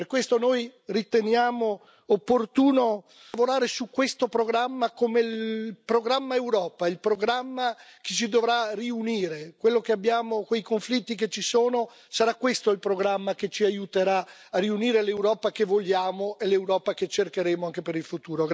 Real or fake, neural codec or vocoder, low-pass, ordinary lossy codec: real; none; none; none